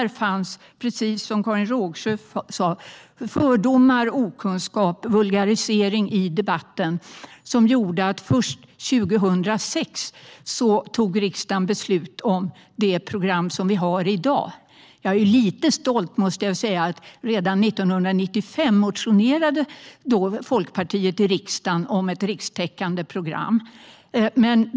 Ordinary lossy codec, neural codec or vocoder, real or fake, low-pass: none; none; real; none